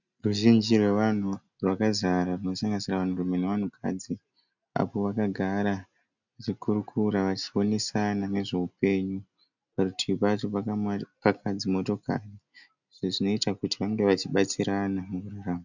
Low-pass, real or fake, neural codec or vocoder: 7.2 kHz; real; none